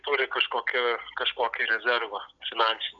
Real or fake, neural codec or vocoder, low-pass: real; none; 7.2 kHz